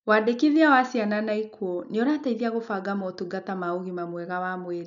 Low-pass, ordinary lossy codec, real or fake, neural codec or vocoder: 7.2 kHz; none; real; none